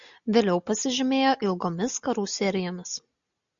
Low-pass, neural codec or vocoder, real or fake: 7.2 kHz; none; real